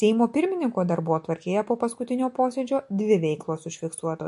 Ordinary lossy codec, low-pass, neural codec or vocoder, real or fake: MP3, 48 kbps; 14.4 kHz; none; real